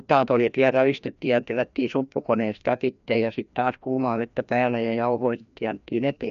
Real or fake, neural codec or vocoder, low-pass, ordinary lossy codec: fake; codec, 16 kHz, 1 kbps, FreqCodec, larger model; 7.2 kHz; none